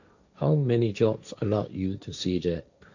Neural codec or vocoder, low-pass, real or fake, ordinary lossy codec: codec, 16 kHz, 1.1 kbps, Voila-Tokenizer; 7.2 kHz; fake; none